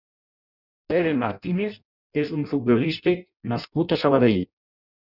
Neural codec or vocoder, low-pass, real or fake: codec, 16 kHz in and 24 kHz out, 0.6 kbps, FireRedTTS-2 codec; 5.4 kHz; fake